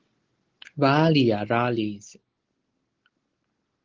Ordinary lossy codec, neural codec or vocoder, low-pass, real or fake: Opus, 16 kbps; none; 7.2 kHz; real